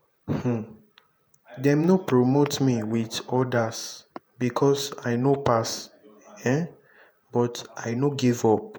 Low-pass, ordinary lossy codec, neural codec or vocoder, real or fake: none; none; vocoder, 48 kHz, 128 mel bands, Vocos; fake